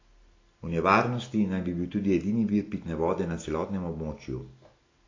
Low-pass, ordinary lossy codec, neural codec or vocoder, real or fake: 7.2 kHz; AAC, 32 kbps; none; real